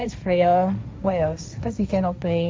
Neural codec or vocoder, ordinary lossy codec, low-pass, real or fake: codec, 16 kHz, 1.1 kbps, Voila-Tokenizer; none; none; fake